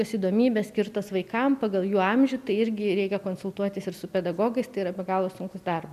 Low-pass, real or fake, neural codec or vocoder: 14.4 kHz; real; none